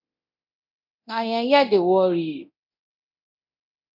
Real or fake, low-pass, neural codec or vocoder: fake; 5.4 kHz; codec, 24 kHz, 0.9 kbps, DualCodec